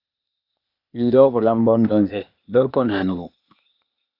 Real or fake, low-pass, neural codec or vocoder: fake; 5.4 kHz; codec, 16 kHz, 0.8 kbps, ZipCodec